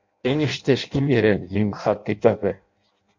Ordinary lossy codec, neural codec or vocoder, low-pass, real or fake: MP3, 64 kbps; codec, 16 kHz in and 24 kHz out, 0.6 kbps, FireRedTTS-2 codec; 7.2 kHz; fake